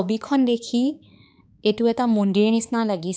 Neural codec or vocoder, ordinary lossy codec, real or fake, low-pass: codec, 16 kHz, 4 kbps, X-Codec, HuBERT features, trained on balanced general audio; none; fake; none